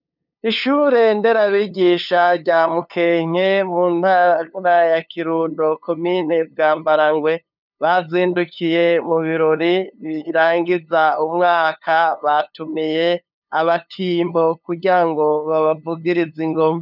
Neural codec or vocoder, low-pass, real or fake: codec, 16 kHz, 2 kbps, FunCodec, trained on LibriTTS, 25 frames a second; 5.4 kHz; fake